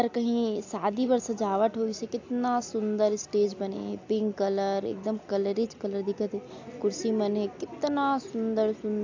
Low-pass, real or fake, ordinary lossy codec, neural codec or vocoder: 7.2 kHz; real; AAC, 48 kbps; none